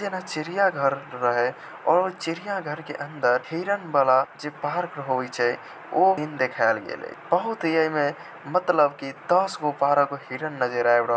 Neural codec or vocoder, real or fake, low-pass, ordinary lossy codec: none; real; none; none